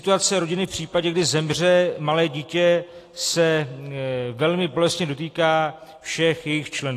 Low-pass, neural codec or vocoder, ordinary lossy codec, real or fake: 14.4 kHz; none; AAC, 48 kbps; real